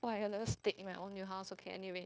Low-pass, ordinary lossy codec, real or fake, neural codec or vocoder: none; none; fake; codec, 16 kHz, 0.9 kbps, LongCat-Audio-Codec